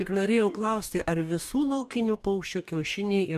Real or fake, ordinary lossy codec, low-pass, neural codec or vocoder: fake; MP3, 96 kbps; 14.4 kHz; codec, 44.1 kHz, 2.6 kbps, DAC